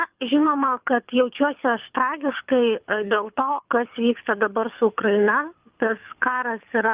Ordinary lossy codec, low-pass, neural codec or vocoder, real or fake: Opus, 32 kbps; 3.6 kHz; codec, 24 kHz, 6 kbps, HILCodec; fake